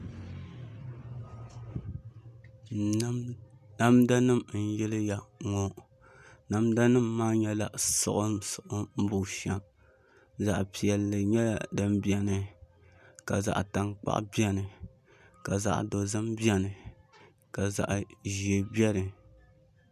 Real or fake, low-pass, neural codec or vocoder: real; 14.4 kHz; none